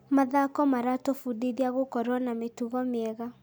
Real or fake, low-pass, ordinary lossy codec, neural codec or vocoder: real; none; none; none